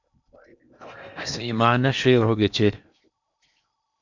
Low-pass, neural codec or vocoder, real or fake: 7.2 kHz; codec, 16 kHz in and 24 kHz out, 0.8 kbps, FocalCodec, streaming, 65536 codes; fake